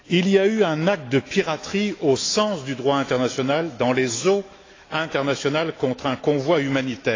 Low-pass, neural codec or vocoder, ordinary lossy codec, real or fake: 7.2 kHz; autoencoder, 48 kHz, 128 numbers a frame, DAC-VAE, trained on Japanese speech; AAC, 32 kbps; fake